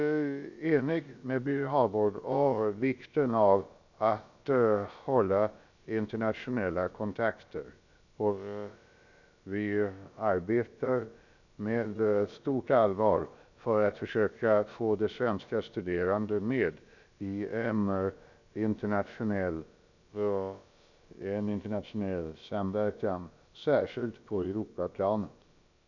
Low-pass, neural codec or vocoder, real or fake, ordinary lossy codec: 7.2 kHz; codec, 16 kHz, about 1 kbps, DyCAST, with the encoder's durations; fake; none